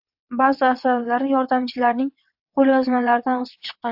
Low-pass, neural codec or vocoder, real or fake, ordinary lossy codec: 5.4 kHz; vocoder, 22.05 kHz, 80 mel bands, WaveNeXt; fake; AAC, 48 kbps